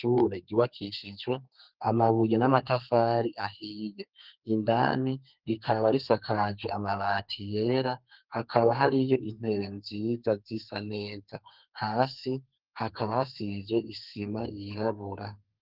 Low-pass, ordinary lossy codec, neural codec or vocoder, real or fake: 5.4 kHz; Opus, 32 kbps; codec, 32 kHz, 1.9 kbps, SNAC; fake